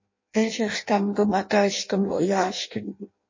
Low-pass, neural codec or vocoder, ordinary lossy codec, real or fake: 7.2 kHz; codec, 16 kHz in and 24 kHz out, 0.6 kbps, FireRedTTS-2 codec; MP3, 32 kbps; fake